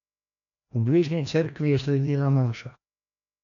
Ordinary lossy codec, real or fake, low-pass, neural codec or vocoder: none; fake; 7.2 kHz; codec, 16 kHz, 1 kbps, FreqCodec, larger model